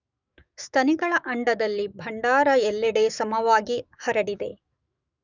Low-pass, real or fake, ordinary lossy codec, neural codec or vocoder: 7.2 kHz; fake; none; autoencoder, 48 kHz, 128 numbers a frame, DAC-VAE, trained on Japanese speech